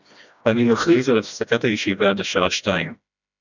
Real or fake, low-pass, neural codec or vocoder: fake; 7.2 kHz; codec, 16 kHz, 1 kbps, FreqCodec, smaller model